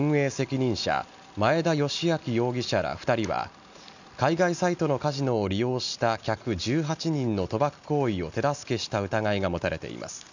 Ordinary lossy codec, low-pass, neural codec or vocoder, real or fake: none; 7.2 kHz; none; real